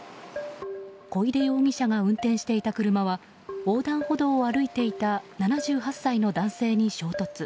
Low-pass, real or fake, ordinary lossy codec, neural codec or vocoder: none; real; none; none